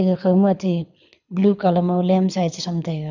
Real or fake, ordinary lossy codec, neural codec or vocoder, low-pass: fake; none; codec, 24 kHz, 6 kbps, HILCodec; 7.2 kHz